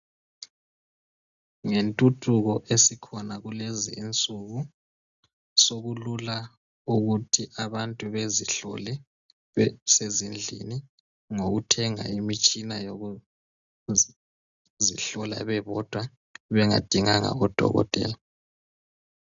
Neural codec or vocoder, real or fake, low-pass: none; real; 7.2 kHz